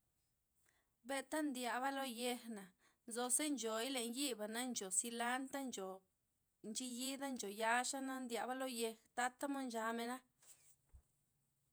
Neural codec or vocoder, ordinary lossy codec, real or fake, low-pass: vocoder, 48 kHz, 128 mel bands, Vocos; none; fake; none